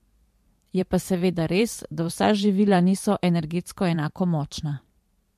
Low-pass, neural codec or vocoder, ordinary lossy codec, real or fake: 14.4 kHz; vocoder, 44.1 kHz, 128 mel bands every 512 samples, BigVGAN v2; MP3, 64 kbps; fake